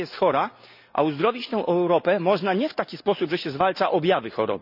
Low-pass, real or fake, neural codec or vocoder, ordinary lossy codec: 5.4 kHz; real; none; none